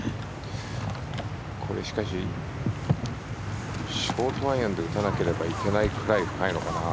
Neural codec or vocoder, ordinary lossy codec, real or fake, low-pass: none; none; real; none